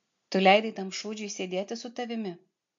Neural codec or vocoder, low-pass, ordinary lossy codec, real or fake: none; 7.2 kHz; MP3, 48 kbps; real